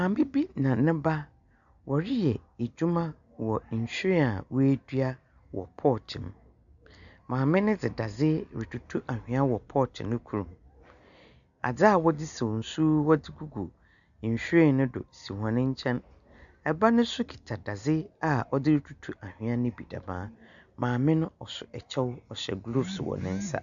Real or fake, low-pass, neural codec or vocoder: real; 7.2 kHz; none